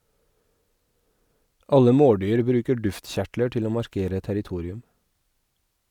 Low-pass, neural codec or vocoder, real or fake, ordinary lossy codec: 19.8 kHz; none; real; none